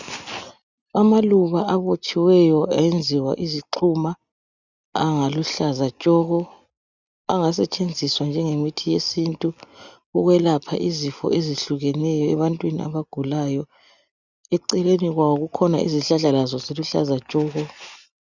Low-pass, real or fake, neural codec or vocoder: 7.2 kHz; real; none